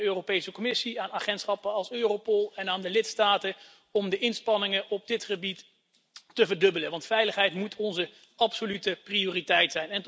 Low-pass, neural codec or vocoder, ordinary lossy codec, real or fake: none; none; none; real